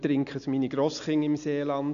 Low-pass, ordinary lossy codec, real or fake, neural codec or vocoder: 7.2 kHz; none; real; none